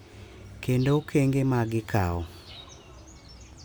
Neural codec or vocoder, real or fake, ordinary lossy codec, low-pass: none; real; none; none